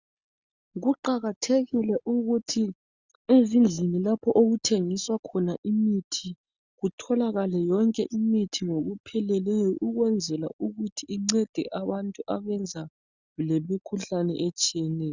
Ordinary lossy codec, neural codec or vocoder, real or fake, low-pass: Opus, 64 kbps; none; real; 7.2 kHz